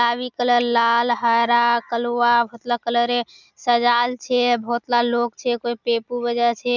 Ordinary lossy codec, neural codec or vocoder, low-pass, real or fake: none; none; 7.2 kHz; real